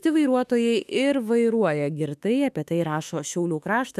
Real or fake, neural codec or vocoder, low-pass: fake; autoencoder, 48 kHz, 32 numbers a frame, DAC-VAE, trained on Japanese speech; 14.4 kHz